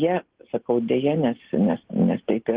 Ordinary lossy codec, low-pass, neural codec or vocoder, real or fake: Opus, 16 kbps; 3.6 kHz; none; real